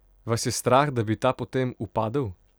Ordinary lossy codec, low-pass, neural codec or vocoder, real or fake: none; none; none; real